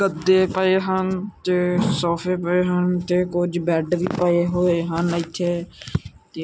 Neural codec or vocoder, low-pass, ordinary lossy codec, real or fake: none; none; none; real